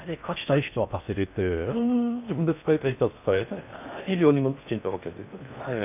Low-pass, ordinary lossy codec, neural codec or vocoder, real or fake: 3.6 kHz; none; codec, 16 kHz in and 24 kHz out, 0.6 kbps, FocalCodec, streaming, 4096 codes; fake